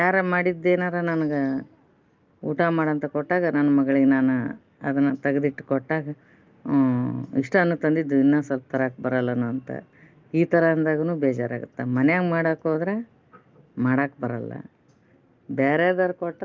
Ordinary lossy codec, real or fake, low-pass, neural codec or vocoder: Opus, 24 kbps; real; 7.2 kHz; none